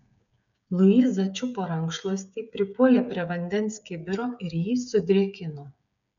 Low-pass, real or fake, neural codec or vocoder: 7.2 kHz; fake; codec, 16 kHz, 8 kbps, FreqCodec, smaller model